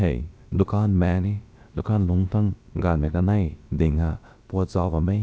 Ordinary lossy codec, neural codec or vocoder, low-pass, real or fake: none; codec, 16 kHz, about 1 kbps, DyCAST, with the encoder's durations; none; fake